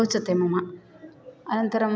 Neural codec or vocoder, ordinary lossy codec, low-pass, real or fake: none; none; none; real